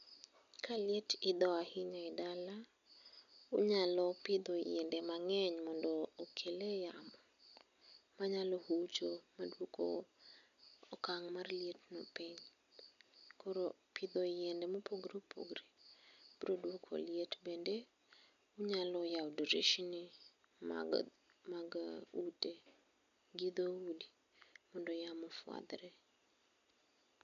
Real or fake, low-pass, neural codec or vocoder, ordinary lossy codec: real; 7.2 kHz; none; none